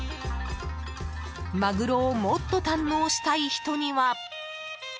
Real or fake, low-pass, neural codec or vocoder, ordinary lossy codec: real; none; none; none